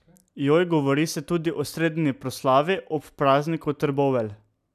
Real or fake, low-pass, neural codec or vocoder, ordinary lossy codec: real; 14.4 kHz; none; none